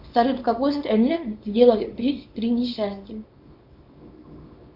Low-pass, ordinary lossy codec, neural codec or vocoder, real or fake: 5.4 kHz; AAC, 48 kbps; codec, 24 kHz, 0.9 kbps, WavTokenizer, small release; fake